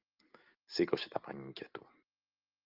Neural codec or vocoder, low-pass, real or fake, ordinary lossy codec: none; 5.4 kHz; real; Opus, 32 kbps